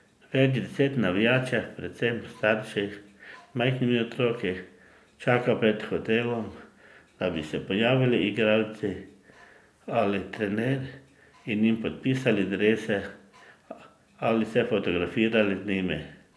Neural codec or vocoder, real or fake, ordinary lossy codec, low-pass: none; real; none; none